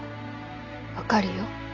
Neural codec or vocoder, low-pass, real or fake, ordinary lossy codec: none; 7.2 kHz; real; none